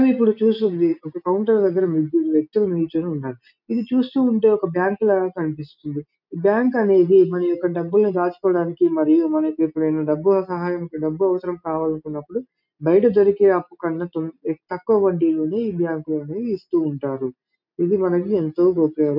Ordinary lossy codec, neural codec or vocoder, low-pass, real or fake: none; none; 5.4 kHz; real